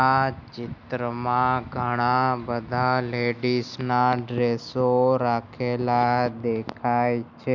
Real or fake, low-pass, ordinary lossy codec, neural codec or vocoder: real; 7.2 kHz; none; none